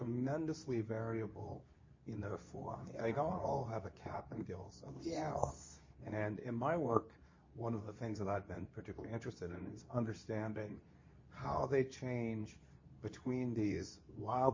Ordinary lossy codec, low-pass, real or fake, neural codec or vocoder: MP3, 32 kbps; 7.2 kHz; fake; codec, 24 kHz, 0.9 kbps, WavTokenizer, medium speech release version 2